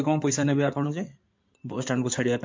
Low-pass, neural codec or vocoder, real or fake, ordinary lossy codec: 7.2 kHz; codec, 16 kHz in and 24 kHz out, 2.2 kbps, FireRedTTS-2 codec; fake; MP3, 48 kbps